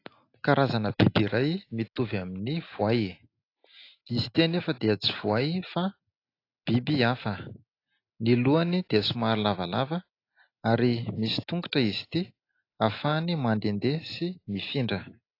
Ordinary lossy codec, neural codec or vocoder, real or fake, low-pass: AAC, 32 kbps; none; real; 5.4 kHz